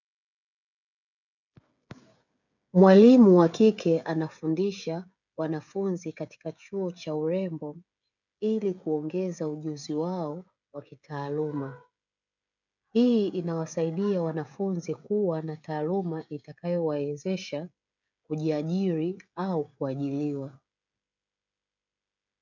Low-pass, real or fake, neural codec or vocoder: 7.2 kHz; fake; codec, 16 kHz, 16 kbps, FreqCodec, smaller model